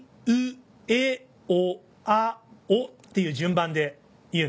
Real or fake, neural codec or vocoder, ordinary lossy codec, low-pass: real; none; none; none